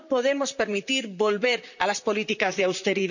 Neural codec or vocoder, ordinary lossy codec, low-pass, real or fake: vocoder, 44.1 kHz, 128 mel bands, Pupu-Vocoder; none; 7.2 kHz; fake